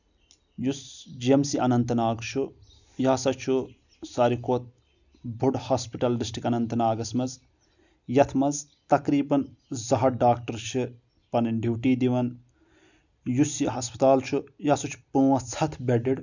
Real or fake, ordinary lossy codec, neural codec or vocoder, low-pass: real; none; none; 7.2 kHz